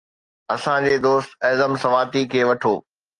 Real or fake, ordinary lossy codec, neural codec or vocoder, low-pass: real; Opus, 24 kbps; none; 10.8 kHz